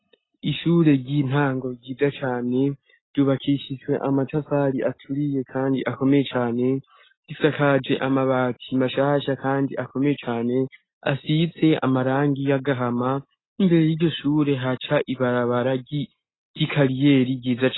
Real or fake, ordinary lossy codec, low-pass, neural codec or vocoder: real; AAC, 16 kbps; 7.2 kHz; none